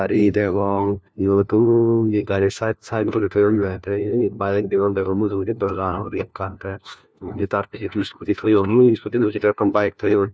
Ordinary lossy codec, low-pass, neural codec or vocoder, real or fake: none; none; codec, 16 kHz, 1 kbps, FunCodec, trained on LibriTTS, 50 frames a second; fake